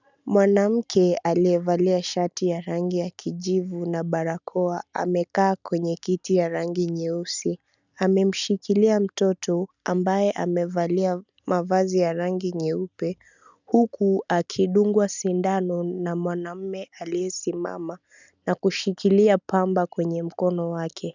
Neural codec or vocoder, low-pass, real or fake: none; 7.2 kHz; real